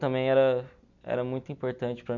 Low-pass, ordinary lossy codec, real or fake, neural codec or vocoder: 7.2 kHz; none; real; none